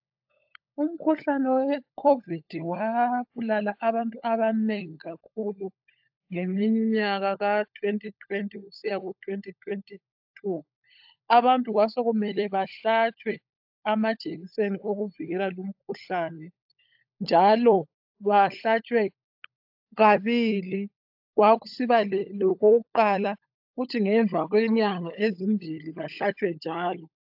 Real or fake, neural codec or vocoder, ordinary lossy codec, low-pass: fake; codec, 16 kHz, 16 kbps, FunCodec, trained on LibriTTS, 50 frames a second; AAC, 48 kbps; 5.4 kHz